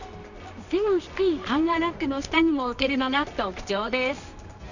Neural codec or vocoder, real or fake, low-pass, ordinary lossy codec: codec, 24 kHz, 0.9 kbps, WavTokenizer, medium music audio release; fake; 7.2 kHz; none